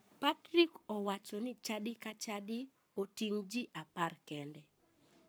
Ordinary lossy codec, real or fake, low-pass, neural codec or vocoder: none; fake; none; codec, 44.1 kHz, 7.8 kbps, Pupu-Codec